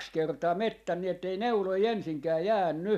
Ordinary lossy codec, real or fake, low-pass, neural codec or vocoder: none; real; 14.4 kHz; none